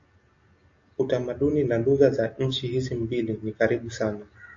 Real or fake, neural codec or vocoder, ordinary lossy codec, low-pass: real; none; AAC, 48 kbps; 7.2 kHz